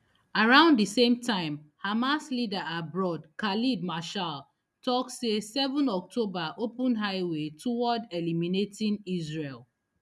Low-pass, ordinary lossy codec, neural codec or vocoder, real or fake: none; none; none; real